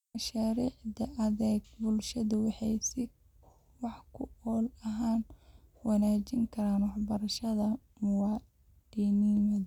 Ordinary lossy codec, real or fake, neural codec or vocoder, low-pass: none; real; none; 19.8 kHz